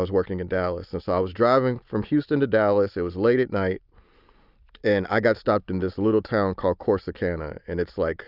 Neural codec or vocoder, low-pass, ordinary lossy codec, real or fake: none; 5.4 kHz; Opus, 64 kbps; real